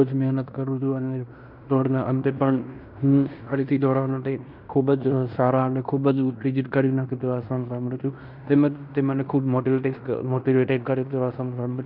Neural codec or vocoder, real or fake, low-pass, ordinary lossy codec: codec, 16 kHz in and 24 kHz out, 0.9 kbps, LongCat-Audio-Codec, fine tuned four codebook decoder; fake; 5.4 kHz; none